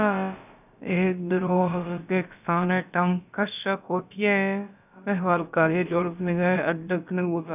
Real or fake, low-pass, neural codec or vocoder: fake; 3.6 kHz; codec, 16 kHz, about 1 kbps, DyCAST, with the encoder's durations